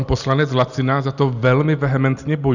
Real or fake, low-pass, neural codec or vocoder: real; 7.2 kHz; none